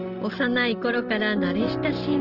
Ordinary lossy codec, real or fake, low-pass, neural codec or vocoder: Opus, 16 kbps; real; 5.4 kHz; none